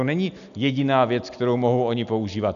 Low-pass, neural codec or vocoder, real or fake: 7.2 kHz; none; real